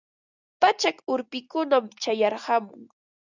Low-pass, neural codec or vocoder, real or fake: 7.2 kHz; none; real